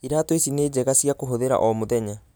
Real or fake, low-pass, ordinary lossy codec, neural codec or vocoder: real; none; none; none